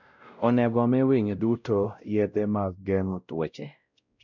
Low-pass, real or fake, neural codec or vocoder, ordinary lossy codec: 7.2 kHz; fake; codec, 16 kHz, 0.5 kbps, X-Codec, WavLM features, trained on Multilingual LibriSpeech; none